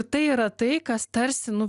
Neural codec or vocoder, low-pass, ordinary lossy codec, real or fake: none; 10.8 kHz; Opus, 64 kbps; real